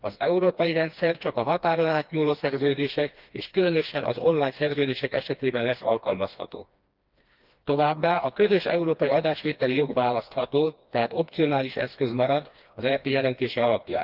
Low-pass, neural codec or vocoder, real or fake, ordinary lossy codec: 5.4 kHz; codec, 16 kHz, 2 kbps, FreqCodec, smaller model; fake; Opus, 32 kbps